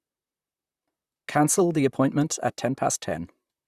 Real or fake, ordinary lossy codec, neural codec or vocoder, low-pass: fake; Opus, 64 kbps; vocoder, 44.1 kHz, 128 mel bands, Pupu-Vocoder; 14.4 kHz